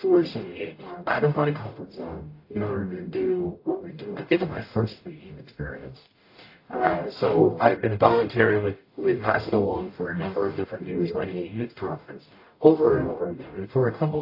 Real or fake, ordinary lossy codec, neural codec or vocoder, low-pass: fake; AAC, 32 kbps; codec, 44.1 kHz, 0.9 kbps, DAC; 5.4 kHz